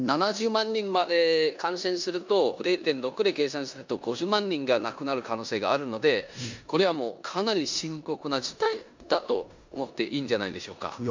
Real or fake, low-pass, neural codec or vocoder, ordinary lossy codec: fake; 7.2 kHz; codec, 16 kHz in and 24 kHz out, 0.9 kbps, LongCat-Audio-Codec, four codebook decoder; MP3, 64 kbps